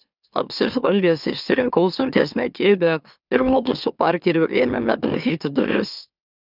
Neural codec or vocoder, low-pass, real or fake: autoencoder, 44.1 kHz, a latent of 192 numbers a frame, MeloTTS; 5.4 kHz; fake